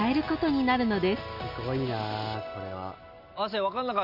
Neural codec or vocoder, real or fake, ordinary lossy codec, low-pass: none; real; none; 5.4 kHz